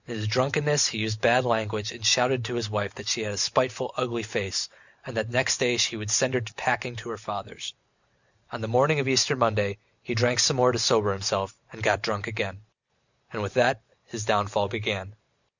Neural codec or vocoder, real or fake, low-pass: none; real; 7.2 kHz